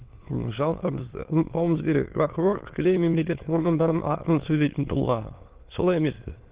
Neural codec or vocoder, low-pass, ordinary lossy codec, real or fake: autoencoder, 22.05 kHz, a latent of 192 numbers a frame, VITS, trained on many speakers; 3.6 kHz; Opus, 16 kbps; fake